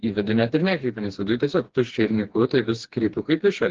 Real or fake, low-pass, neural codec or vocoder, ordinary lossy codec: fake; 7.2 kHz; codec, 16 kHz, 2 kbps, FreqCodec, smaller model; Opus, 16 kbps